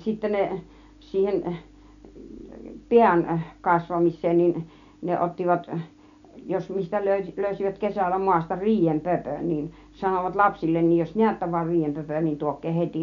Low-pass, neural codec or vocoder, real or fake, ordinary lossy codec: 7.2 kHz; none; real; none